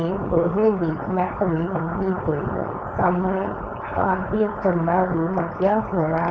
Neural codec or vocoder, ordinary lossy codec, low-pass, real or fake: codec, 16 kHz, 4.8 kbps, FACodec; none; none; fake